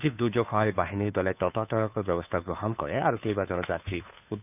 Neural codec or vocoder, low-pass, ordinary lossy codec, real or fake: codec, 16 kHz, 2 kbps, FunCodec, trained on Chinese and English, 25 frames a second; 3.6 kHz; none; fake